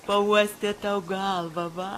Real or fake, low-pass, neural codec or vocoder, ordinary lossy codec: real; 14.4 kHz; none; AAC, 48 kbps